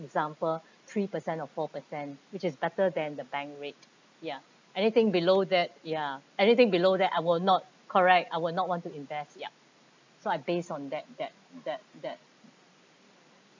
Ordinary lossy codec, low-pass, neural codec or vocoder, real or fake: MP3, 64 kbps; 7.2 kHz; none; real